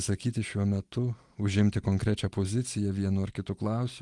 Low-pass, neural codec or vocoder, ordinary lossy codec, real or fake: 10.8 kHz; none; Opus, 24 kbps; real